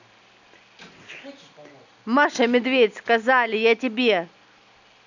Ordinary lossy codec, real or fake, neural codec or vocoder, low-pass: none; real; none; 7.2 kHz